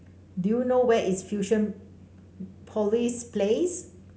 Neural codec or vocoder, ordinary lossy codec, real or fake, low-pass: none; none; real; none